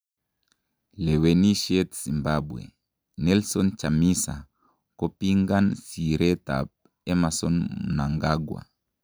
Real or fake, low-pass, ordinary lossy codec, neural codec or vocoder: real; none; none; none